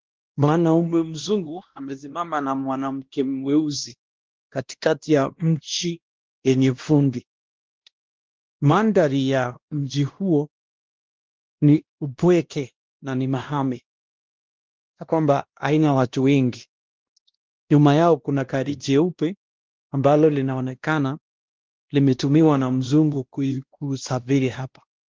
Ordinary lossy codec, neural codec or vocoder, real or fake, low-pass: Opus, 16 kbps; codec, 16 kHz, 1 kbps, X-Codec, WavLM features, trained on Multilingual LibriSpeech; fake; 7.2 kHz